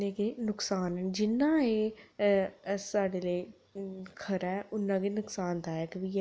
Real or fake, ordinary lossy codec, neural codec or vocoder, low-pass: real; none; none; none